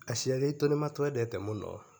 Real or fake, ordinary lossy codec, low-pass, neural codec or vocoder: real; none; none; none